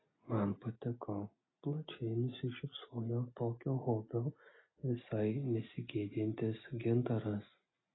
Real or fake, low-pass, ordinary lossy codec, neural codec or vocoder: real; 7.2 kHz; AAC, 16 kbps; none